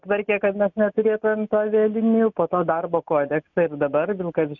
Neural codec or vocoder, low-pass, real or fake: none; 7.2 kHz; real